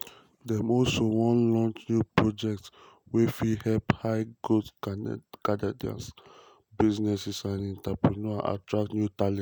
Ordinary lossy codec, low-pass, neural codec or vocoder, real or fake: none; none; none; real